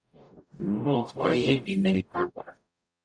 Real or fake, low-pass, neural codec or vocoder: fake; 9.9 kHz; codec, 44.1 kHz, 0.9 kbps, DAC